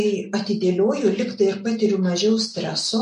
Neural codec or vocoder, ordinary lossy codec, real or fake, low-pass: none; MP3, 48 kbps; real; 14.4 kHz